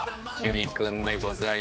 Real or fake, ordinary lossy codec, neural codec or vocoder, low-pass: fake; none; codec, 16 kHz, 2 kbps, X-Codec, HuBERT features, trained on general audio; none